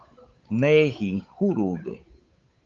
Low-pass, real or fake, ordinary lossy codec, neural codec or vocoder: 7.2 kHz; fake; Opus, 24 kbps; codec, 16 kHz, 8 kbps, FunCodec, trained on Chinese and English, 25 frames a second